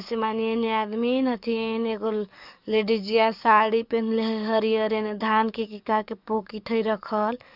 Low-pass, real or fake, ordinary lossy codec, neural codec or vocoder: 5.4 kHz; fake; none; codec, 44.1 kHz, 7.8 kbps, DAC